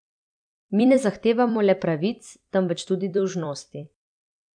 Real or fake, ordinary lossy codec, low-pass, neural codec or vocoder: fake; MP3, 96 kbps; 9.9 kHz; vocoder, 44.1 kHz, 128 mel bands every 256 samples, BigVGAN v2